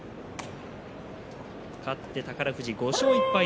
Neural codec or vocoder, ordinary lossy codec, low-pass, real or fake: none; none; none; real